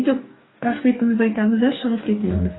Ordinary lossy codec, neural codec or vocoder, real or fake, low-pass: AAC, 16 kbps; codec, 44.1 kHz, 2.6 kbps, DAC; fake; 7.2 kHz